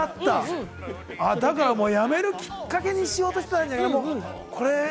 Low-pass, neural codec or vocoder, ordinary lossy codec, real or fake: none; none; none; real